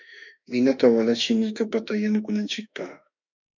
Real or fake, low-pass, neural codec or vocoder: fake; 7.2 kHz; autoencoder, 48 kHz, 32 numbers a frame, DAC-VAE, trained on Japanese speech